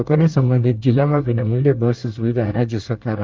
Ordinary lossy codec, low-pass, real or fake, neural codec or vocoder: Opus, 24 kbps; 7.2 kHz; fake; codec, 24 kHz, 1 kbps, SNAC